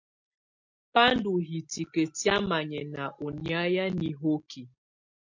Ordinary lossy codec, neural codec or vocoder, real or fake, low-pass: MP3, 48 kbps; none; real; 7.2 kHz